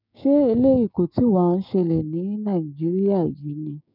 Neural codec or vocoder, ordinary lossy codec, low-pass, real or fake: codec, 16 kHz, 6 kbps, DAC; none; 5.4 kHz; fake